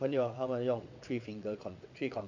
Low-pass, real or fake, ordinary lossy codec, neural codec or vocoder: 7.2 kHz; fake; AAC, 48 kbps; vocoder, 22.05 kHz, 80 mel bands, WaveNeXt